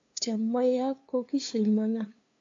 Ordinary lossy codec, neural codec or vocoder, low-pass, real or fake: AAC, 48 kbps; codec, 16 kHz, 2 kbps, FunCodec, trained on LibriTTS, 25 frames a second; 7.2 kHz; fake